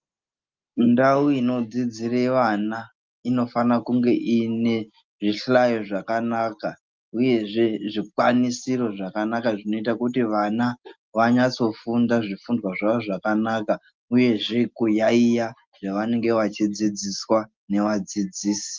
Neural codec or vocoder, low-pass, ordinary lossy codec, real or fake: none; 7.2 kHz; Opus, 24 kbps; real